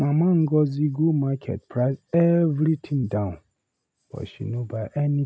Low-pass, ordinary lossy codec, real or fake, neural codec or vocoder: none; none; real; none